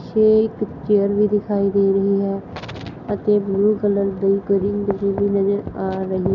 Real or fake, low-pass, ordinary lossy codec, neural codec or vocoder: real; 7.2 kHz; none; none